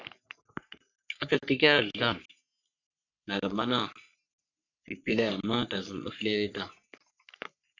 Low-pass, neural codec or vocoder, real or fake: 7.2 kHz; codec, 44.1 kHz, 3.4 kbps, Pupu-Codec; fake